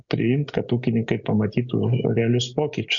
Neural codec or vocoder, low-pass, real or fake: none; 7.2 kHz; real